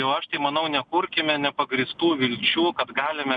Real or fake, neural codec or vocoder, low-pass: real; none; 9.9 kHz